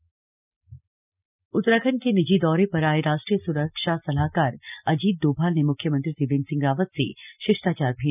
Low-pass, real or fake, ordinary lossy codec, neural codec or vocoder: 3.6 kHz; real; none; none